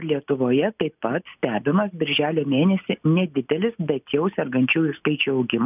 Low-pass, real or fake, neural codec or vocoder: 3.6 kHz; real; none